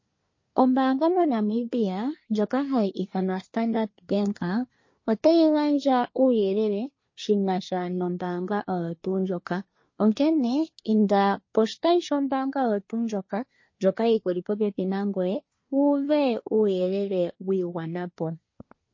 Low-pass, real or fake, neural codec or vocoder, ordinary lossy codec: 7.2 kHz; fake; codec, 24 kHz, 1 kbps, SNAC; MP3, 32 kbps